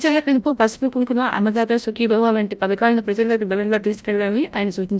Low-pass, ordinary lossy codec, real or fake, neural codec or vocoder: none; none; fake; codec, 16 kHz, 0.5 kbps, FreqCodec, larger model